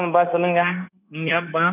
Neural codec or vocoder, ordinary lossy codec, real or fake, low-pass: codec, 16 kHz, 0.9 kbps, LongCat-Audio-Codec; none; fake; 3.6 kHz